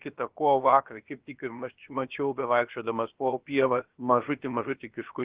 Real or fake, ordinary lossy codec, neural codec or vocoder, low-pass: fake; Opus, 16 kbps; codec, 16 kHz, about 1 kbps, DyCAST, with the encoder's durations; 3.6 kHz